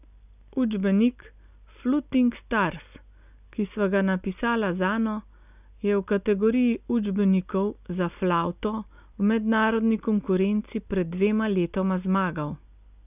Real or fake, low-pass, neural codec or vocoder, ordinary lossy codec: real; 3.6 kHz; none; none